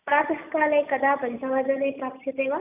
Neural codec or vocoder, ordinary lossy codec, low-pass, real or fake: none; none; 3.6 kHz; real